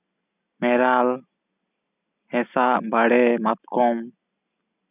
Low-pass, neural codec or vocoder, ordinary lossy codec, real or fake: 3.6 kHz; none; none; real